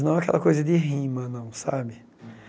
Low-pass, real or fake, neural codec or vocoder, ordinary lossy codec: none; real; none; none